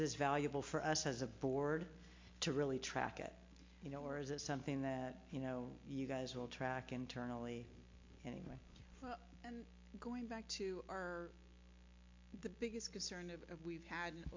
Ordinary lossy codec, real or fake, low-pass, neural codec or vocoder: MP3, 48 kbps; real; 7.2 kHz; none